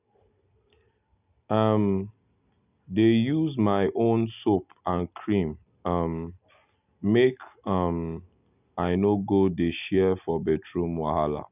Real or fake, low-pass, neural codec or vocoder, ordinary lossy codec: real; 3.6 kHz; none; none